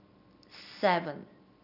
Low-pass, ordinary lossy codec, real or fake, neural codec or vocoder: 5.4 kHz; none; real; none